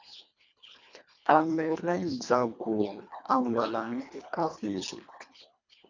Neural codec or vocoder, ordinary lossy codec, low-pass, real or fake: codec, 24 kHz, 1.5 kbps, HILCodec; MP3, 64 kbps; 7.2 kHz; fake